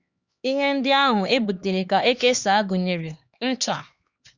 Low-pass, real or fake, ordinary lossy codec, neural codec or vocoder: 7.2 kHz; fake; Opus, 64 kbps; codec, 16 kHz, 2 kbps, X-Codec, HuBERT features, trained on LibriSpeech